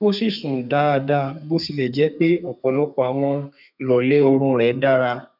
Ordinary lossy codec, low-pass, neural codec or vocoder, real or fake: none; 5.4 kHz; codec, 32 kHz, 1.9 kbps, SNAC; fake